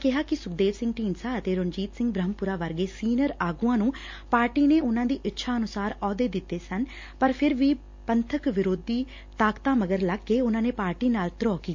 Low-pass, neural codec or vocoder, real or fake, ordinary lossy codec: 7.2 kHz; none; real; MP3, 48 kbps